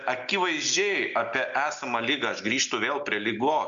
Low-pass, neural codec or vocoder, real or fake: 7.2 kHz; none; real